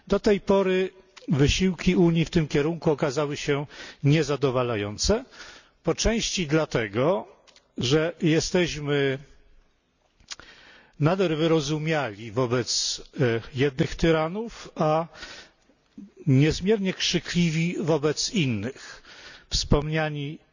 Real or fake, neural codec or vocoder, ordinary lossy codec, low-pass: real; none; none; 7.2 kHz